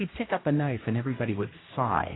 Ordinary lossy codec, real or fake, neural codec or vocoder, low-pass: AAC, 16 kbps; fake; codec, 16 kHz, 1 kbps, X-Codec, HuBERT features, trained on general audio; 7.2 kHz